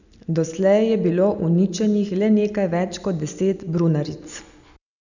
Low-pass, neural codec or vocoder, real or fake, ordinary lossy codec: 7.2 kHz; none; real; none